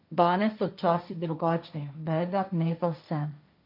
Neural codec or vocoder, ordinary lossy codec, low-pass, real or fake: codec, 16 kHz, 1.1 kbps, Voila-Tokenizer; none; 5.4 kHz; fake